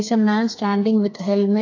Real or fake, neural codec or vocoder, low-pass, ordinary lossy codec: fake; codec, 32 kHz, 1.9 kbps, SNAC; 7.2 kHz; AAC, 48 kbps